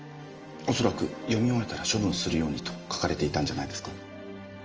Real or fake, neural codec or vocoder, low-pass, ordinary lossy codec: real; none; 7.2 kHz; Opus, 24 kbps